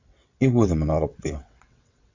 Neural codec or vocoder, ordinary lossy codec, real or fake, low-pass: vocoder, 22.05 kHz, 80 mel bands, WaveNeXt; AAC, 48 kbps; fake; 7.2 kHz